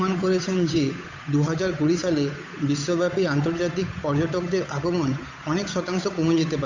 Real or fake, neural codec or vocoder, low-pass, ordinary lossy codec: fake; codec, 16 kHz, 8 kbps, FunCodec, trained on Chinese and English, 25 frames a second; 7.2 kHz; AAC, 48 kbps